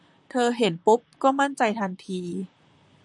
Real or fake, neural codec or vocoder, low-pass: fake; vocoder, 44.1 kHz, 128 mel bands, Pupu-Vocoder; 10.8 kHz